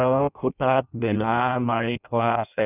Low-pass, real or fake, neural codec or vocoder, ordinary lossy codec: 3.6 kHz; fake; codec, 16 kHz in and 24 kHz out, 0.6 kbps, FireRedTTS-2 codec; none